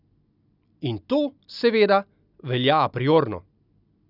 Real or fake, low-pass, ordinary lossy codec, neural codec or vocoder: real; 5.4 kHz; none; none